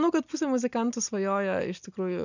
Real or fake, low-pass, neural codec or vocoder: real; 7.2 kHz; none